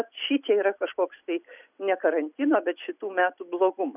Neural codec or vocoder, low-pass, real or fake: none; 3.6 kHz; real